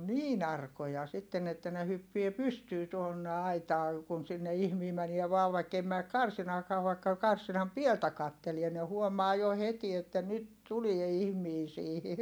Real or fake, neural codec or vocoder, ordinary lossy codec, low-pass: real; none; none; none